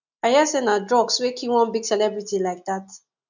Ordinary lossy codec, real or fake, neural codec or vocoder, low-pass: none; real; none; 7.2 kHz